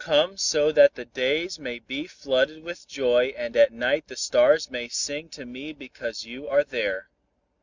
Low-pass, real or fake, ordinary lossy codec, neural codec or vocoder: 7.2 kHz; real; Opus, 64 kbps; none